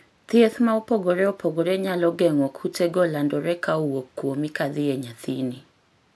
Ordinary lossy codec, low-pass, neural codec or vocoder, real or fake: none; none; none; real